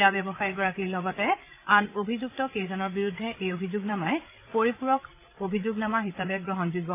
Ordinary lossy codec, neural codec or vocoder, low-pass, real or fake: AAC, 24 kbps; codec, 16 kHz, 8 kbps, FreqCodec, larger model; 3.6 kHz; fake